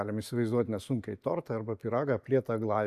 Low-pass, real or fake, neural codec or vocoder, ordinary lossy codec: 14.4 kHz; real; none; AAC, 96 kbps